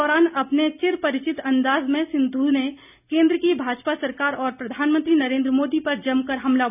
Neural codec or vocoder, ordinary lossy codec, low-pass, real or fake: none; none; 3.6 kHz; real